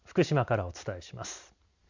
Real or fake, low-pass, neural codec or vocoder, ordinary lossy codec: real; 7.2 kHz; none; Opus, 64 kbps